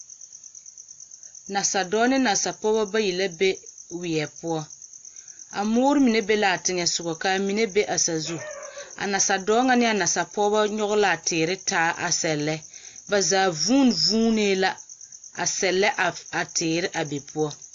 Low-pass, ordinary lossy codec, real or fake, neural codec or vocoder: 7.2 kHz; AAC, 48 kbps; real; none